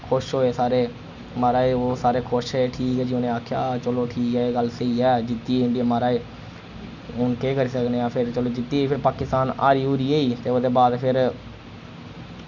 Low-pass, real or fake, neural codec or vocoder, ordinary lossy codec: 7.2 kHz; real; none; none